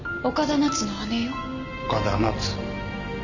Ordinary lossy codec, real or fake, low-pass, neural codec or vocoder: none; real; 7.2 kHz; none